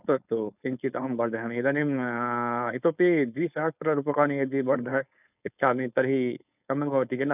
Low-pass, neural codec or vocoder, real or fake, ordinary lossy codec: 3.6 kHz; codec, 16 kHz, 4.8 kbps, FACodec; fake; none